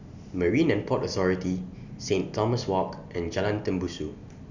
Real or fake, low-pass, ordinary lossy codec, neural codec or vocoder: real; 7.2 kHz; none; none